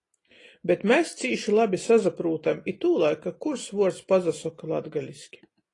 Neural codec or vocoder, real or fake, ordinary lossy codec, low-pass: none; real; AAC, 32 kbps; 10.8 kHz